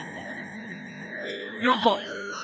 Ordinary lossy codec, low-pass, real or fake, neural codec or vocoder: none; none; fake; codec, 16 kHz, 1 kbps, FreqCodec, larger model